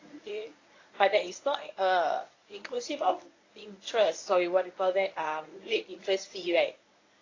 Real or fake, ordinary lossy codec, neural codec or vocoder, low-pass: fake; AAC, 32 kbps; codec, 24 kHz, 0.9 kbps, WavTokenizer, medium speech release version 1; 7.2 kHz